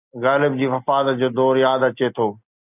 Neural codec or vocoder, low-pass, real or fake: none; 3.6 kHz; real